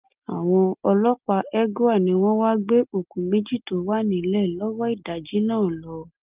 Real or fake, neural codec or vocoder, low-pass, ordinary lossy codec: real; none; 3.6 kHz; Opus, 32 kbps